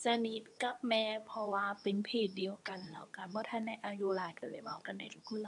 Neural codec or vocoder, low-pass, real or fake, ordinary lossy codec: codec, 24 kHz, 0.9 kbps, WavTokenizer, medium speech release version 2; 10.8 kHz; fake; none